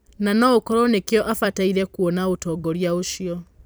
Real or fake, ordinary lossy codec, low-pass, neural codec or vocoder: fake; none; none; vocoder, 44.1 kHz, 128 mel bands every 256 samples, BigVGAN v2